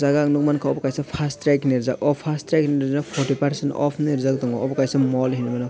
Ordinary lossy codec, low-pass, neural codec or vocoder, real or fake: none; none; none; real